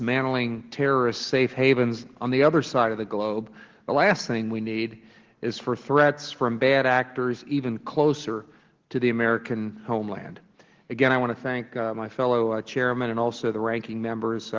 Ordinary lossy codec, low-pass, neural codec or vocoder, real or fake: Opus, 16 kbps; 7.2 kHz; none; real